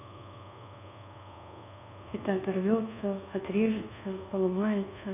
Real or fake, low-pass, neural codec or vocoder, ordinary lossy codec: fake; 3.6 kHz; codec, 24 kHz, 1.2 kbps, DualCodec; none